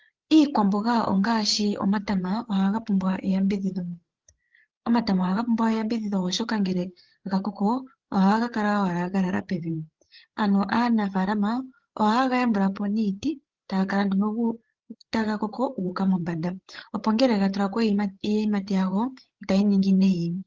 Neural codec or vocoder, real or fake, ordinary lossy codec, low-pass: codec, 16 kHz, 8 kbps, FreqCodec, larger model; fake; Opus, 16 kbps; 7.2 kHz